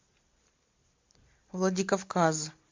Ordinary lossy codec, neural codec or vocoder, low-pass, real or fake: MP3, 64 kbps; none; 7.2 kHz; real